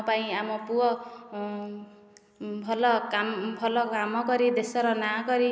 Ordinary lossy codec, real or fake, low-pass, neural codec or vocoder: none; real; none; none